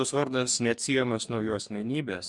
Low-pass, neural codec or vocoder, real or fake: 10.8 kHz; codec, 44.1 kHz, 2.6 kbps, DAC; fake